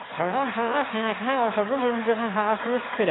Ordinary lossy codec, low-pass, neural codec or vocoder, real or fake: AAC, 16 kbps; 7.2 kHz; autoencoder, 22.05 kHz, a latent of 192 numbers a frame, VITS, trained on one speaker; fake